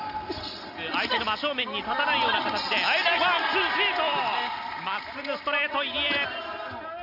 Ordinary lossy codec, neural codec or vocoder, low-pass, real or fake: none; none; 5.4 kHz; real